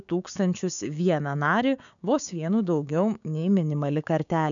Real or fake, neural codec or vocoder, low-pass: fake; codec, 16 kHz, 6 kbps, DAC; 7.2 kHz